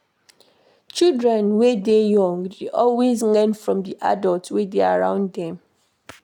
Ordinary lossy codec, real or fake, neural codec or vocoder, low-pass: none; real; none; 19.8 kHz